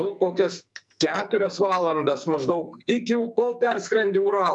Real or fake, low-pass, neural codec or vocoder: fake; 10.8 kHz; codec, 44.1 kHz, 2.6 kbps, SNAC